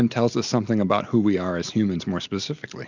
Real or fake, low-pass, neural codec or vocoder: real; 7.2 kHz; none